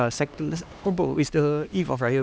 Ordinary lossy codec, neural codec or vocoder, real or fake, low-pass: none; codec, 16 kHz, 2 kbps, X-Codec, HuBERT features, trained on LibriSpeech; fake; none